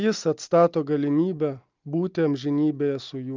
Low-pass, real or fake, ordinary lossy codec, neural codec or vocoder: 7.2 kHz; real; Opus, 24 kbps; none